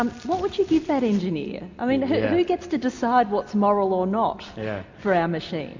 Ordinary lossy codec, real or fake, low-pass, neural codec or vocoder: MP3, 64 kbps; real; 7.2 kHz; none